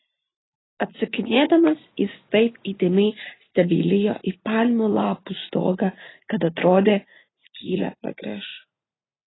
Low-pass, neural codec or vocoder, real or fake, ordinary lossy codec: 7.2 kHz; none; real; AAC, 16 kbps